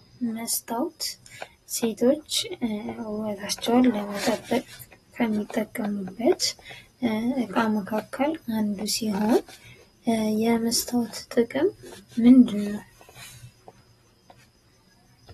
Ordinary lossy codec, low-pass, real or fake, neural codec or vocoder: AAC, 32 kbps; 19.8 kHz; real; none